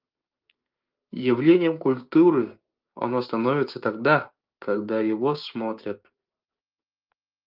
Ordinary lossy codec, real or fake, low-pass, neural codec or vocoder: Opus, 24 kbps; fake; 5.4 kHz; codec, 16 kHz, 6 kbps, DAC